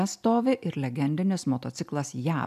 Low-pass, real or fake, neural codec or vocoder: 14.4 kHz; real; none